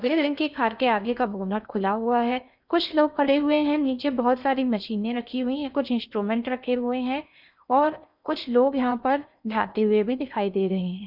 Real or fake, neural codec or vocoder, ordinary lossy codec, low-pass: fake; codec, 16 kHz in and 24 kHz out, 0.8 kbps, FocalCodec, streaming, 65536 codes; none; 5.4 kHz